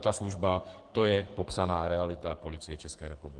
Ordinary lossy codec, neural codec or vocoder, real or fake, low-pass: Opus, 24 kbps; codec, 44.1 kHz, 2.6 kbps, SNAC; fake; 10.8 kHz